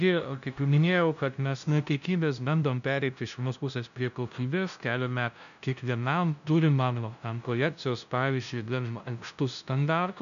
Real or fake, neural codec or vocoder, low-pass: fake; codec, 16 kHz, 0.5 kbps, FunCodec, trained on LibriTTS, 25 frames a second; 7.2 kHz